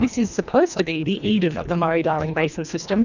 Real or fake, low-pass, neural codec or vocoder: fake; 7.2 kHz; codec, 24 kHz, 1.5 kbps, HILCodec